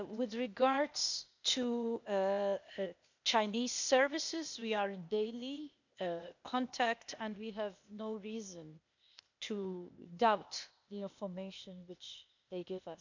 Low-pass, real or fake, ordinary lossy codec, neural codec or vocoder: 7.2 kHz; fake; none; codec, 16 kHz, 0.8 kbps, ZipCodec